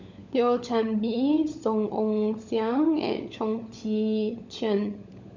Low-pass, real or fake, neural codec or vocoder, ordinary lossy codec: 7.2 kHz; fake; codec, 16 kHz, 16 kbps, FunCodec, trained on LibriTTS, 50 frames a second; none